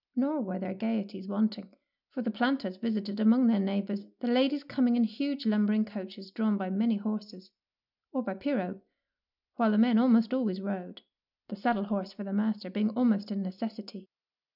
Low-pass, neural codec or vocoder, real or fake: 5.4 kHz; none; real